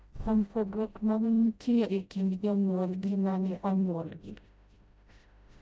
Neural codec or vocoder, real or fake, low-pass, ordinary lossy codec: codec, 16 kHz, 0.5 kbps, FreqCodec, smaller model; fake; none; none